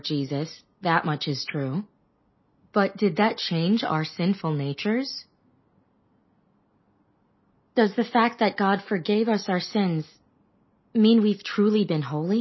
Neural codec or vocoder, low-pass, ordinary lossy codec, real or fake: none; 7.2 kHz; MP3, 24 kbps; real